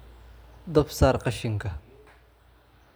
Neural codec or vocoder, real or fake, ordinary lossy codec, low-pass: none; real; none; none